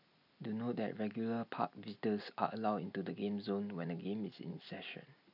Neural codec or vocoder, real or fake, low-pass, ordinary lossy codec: none; real; 5.4 kHz; none